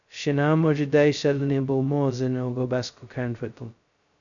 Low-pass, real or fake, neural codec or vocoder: 7.2 kHz; fake; codec, 16 kHz, 0.2 kbps, FocalCodec